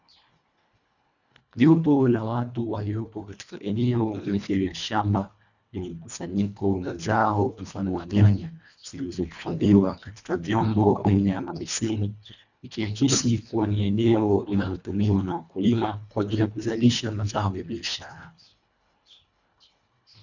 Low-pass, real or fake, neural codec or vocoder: 7.2 kHz; fake; codec, 24 kHz, 1.5 kbps, HILCodec